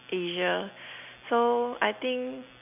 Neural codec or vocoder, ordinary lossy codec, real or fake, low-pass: none; none; real; 3.6 kHz